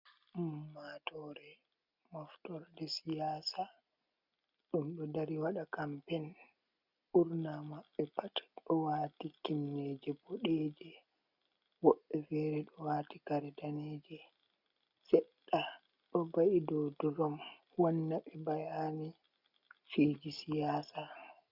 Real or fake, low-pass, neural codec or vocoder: real; 5.4 kHz; none